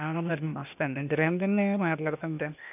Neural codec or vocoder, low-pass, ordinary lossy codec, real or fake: codec, 16 kHz, 0.8 kbps, ZipCodec; 3.6 kHz; none; fake